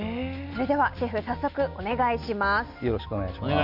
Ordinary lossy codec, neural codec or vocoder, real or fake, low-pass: none; none; real; 5.4 kHz